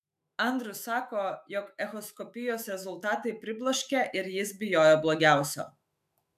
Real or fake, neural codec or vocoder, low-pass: fake; autoencoder, 48 kHz, 128 numbers a frame, DAC-VAE, trained on Japanese speech; 14.4 kHz